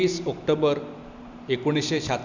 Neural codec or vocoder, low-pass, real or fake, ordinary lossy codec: none; 7.2 kHz; real; none